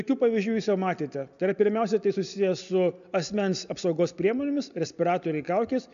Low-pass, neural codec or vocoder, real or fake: 7.2 kHz; none; real